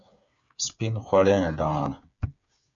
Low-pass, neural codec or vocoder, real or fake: 7.2 kHz; codec, 16 kHz, 8 kbps, FreqCodec, smaller model; fake